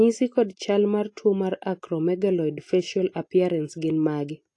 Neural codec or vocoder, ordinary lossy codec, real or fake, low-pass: none; AAC, 48 kbps; real; 10.8 kHz